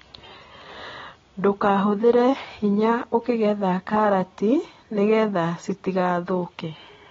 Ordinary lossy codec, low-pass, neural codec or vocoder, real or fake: AAC, 24 kbps; 7.2 kHz; none; real